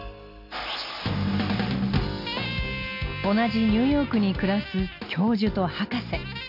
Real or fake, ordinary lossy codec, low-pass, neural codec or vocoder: real; AAC, 48 kbps; 5.4 kHz; none